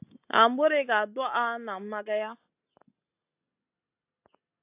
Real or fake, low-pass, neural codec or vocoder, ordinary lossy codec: real; 3.6 kHz; none; AAC, 24 kbps